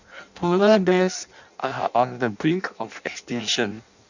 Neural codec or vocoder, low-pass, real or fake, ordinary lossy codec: codec, 16 kHz in and 24 kHz out, 0.6 kbps, FireRedTTS-2 codec; 7.2 kHz; fake; none